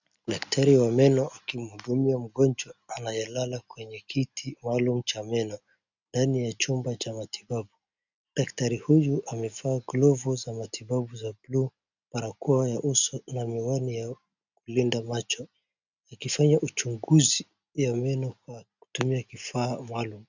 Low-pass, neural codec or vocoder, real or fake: 7.2 kHz; none; real